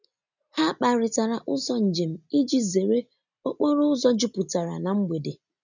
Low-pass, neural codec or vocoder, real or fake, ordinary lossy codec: 7.2 kHz; none; real; none